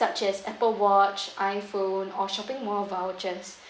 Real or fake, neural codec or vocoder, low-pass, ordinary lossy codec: real; none; none; none